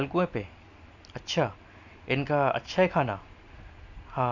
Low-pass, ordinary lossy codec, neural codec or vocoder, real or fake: 7.2 kHz; none; none; real